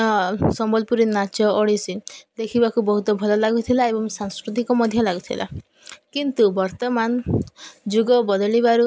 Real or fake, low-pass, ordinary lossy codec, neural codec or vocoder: real; none; none; none